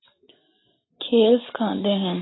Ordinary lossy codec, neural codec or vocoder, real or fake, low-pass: AAC, 16 kbps; none; real; 7.2 kHz